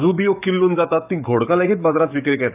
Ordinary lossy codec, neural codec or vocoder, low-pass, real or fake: none; codec, 44.1 kHz, 7.8 kbps, DAC; 3.6 kHz; fake